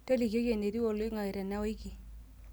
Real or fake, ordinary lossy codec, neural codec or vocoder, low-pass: real; none; none; none